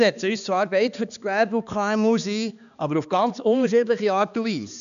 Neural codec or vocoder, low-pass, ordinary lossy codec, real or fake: codec, 16 kHz, 2 kbps, X-Codec, HuBERT features, trained on balanced general audio; 7.2 kHz; none; fake